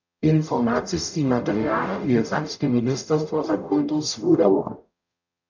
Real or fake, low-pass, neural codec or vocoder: fake; 7.2 kHz; codec, 44.1 kHz, 0.9 kbps, DAC